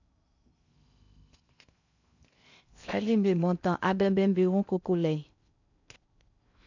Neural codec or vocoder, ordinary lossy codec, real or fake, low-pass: codec, 16 kHz in and 24 kHz out, 0.6 kbps, FocalCodec, streaming, 2048 codes; none; fake; 7.2 kHz